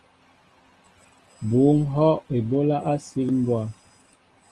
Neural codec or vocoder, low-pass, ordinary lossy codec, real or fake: none; 10.8 kHz; Opus, 24 kbps; real